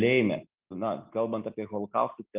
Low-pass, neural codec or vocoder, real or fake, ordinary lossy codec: 3.6 kHz; none; real; Opus, 32 kbps